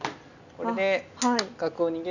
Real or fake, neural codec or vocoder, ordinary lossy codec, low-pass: real; none; none; 7.2 kHz